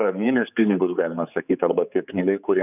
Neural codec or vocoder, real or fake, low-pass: codec, 16 kHz, 4 kbps, X-Codec, HuBERT features, trained on general audio; fake; 3.6 kHz